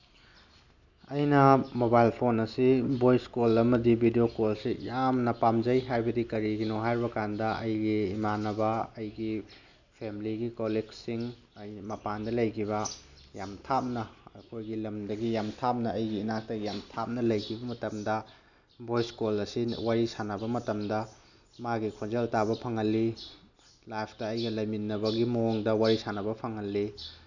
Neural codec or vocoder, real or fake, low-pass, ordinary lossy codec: none; real; 7.2 kHz; none